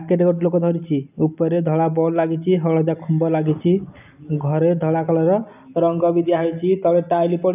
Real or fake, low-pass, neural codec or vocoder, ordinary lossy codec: real; 3.6 kHz; none; none